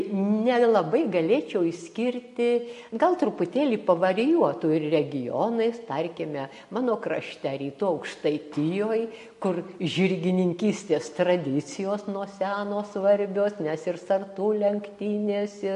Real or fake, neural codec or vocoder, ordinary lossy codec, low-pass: real; none; MP3, 48 kbps; 14.4 kHz